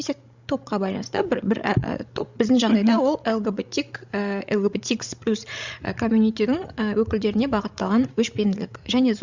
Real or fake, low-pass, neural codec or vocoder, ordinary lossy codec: fake; 7.2 kHz; codec, 16 kHz, 16 kbps, FreqCodec, larger model; Opus, 64 kbps